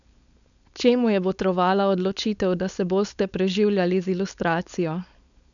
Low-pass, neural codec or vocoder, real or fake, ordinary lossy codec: 7.2 kHz; codec, 16 kHz, 4.8 kbps, FACodec; fake; none